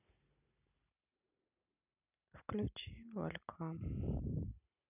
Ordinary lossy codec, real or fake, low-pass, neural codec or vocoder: none; real; 3.6 kHz; none